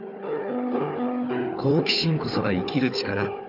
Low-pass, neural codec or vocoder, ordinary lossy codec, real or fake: 5.4 kHz; codec, 16 kHz, 4 kbps, FunCodec, trained on Chinese and English, 50 frames a second; none; fake